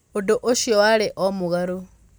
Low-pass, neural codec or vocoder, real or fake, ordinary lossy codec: none; none; real; none